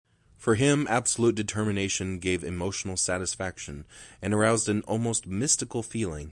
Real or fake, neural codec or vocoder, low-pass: real; none; 10.8 kHz